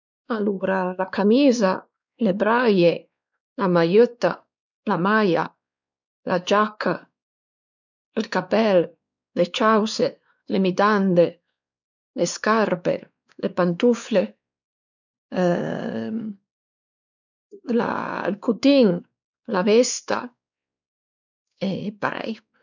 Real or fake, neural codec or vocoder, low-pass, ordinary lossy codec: fake; codec, 16 kHz, 2 kbps, X-Codec, WavLM features, trained on Multilingual LibriSpeech; 7.2 kHz; none